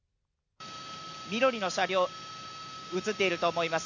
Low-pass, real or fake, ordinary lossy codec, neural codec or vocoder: 7.2 kHz; real; none; none